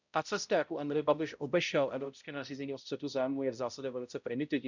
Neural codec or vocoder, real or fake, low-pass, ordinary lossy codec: codec, 16 kHz, 0.5 kbps, X-Codec, HuBERT features, trained on balanced general audio; fake; 7.2 kHz; none